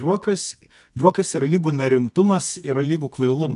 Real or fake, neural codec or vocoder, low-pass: fake; codec, 24 kHz, 0.9 kbps, WavTokenizer, medium music audio release; 10.8 kHz